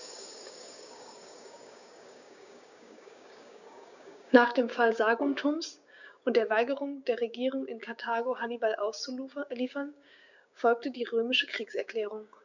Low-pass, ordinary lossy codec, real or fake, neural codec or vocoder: 7.2 kHz; none; fake; codec, 16 kHz, 6 kbps, DAC